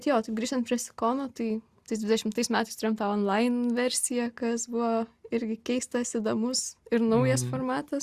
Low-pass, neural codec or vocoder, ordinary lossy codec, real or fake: 14.4 kHz; none; Opus, 64 kbps; real